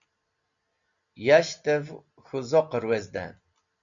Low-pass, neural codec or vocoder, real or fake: 7.2 kHz; none; real